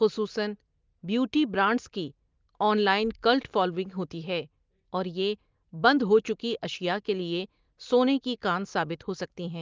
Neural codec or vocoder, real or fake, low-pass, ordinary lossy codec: none; real; 7.2 kHz; Opus, 32 kbps